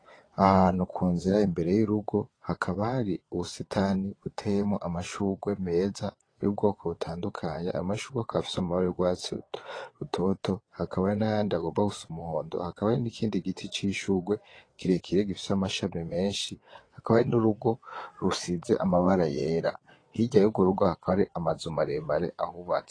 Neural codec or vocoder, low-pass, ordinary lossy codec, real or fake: vocoder, 22.05 kHz, 80 mel bands, WaveNeXt; 9.9 kHz; AAC, 32 kbps; fake